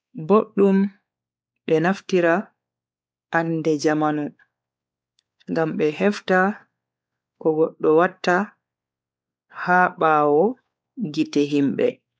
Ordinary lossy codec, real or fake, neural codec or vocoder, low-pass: none; fake; codec, 16 kHz, 4 kbps, X-Codec, WavLM features, trained on Multilingual LibriSpeech; none